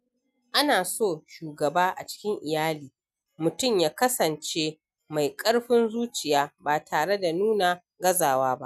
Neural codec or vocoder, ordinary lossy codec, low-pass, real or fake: none; none; 14.4 kHz; real